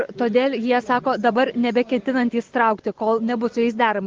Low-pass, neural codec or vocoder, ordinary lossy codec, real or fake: 7.2 kHz; none; Opus, 16 kbps; real